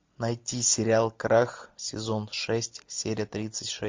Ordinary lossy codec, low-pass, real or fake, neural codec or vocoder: MP3, 48 kbps; 7.2 kHz; real; none